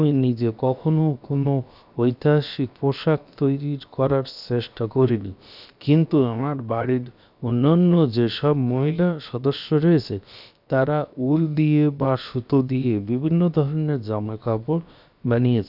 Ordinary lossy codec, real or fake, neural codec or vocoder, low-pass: none; fake; codec, 16 kHz, about 1 kbps, DyCAST, with the encoder's durations; 5.4 kHz